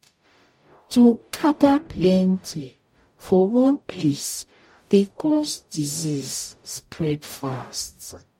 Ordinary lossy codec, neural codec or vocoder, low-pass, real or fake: MP3, 64 kbps; codec, 44.1 kHz, 0.9 kbps, DAC; 19.8 kHz; fake